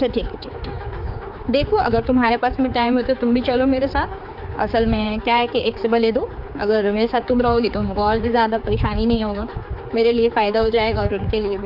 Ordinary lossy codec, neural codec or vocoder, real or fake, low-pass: AAC, 48 kbps; codec, 16 kHz, 4 kbps, X-Codec, HuBERT features, trained on general audio; fake; 5.4 kHz